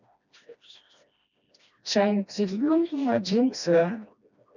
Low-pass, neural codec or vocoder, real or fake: 7.2 kHz; codec, 16 kHz, 1 kbps, FreqCodec, smaller model; fake